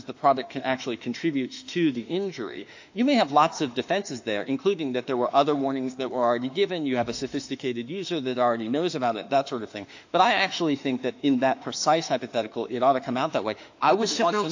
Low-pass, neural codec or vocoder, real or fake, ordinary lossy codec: 7.2 kHz; autoencoder, 48 kHz, 32 numbers a frame, DAC-VAE, trained on Japanese speech; fake; AAC, 48 kbps